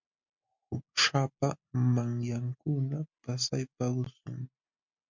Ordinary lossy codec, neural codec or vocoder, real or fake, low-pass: MP3, 64 kbps; none; real; 7.2 kHz